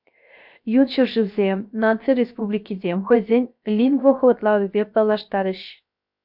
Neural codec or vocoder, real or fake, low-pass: codec, 16 kHz, 0.7 kbps, FocalCodec; fake; 5.4 kHz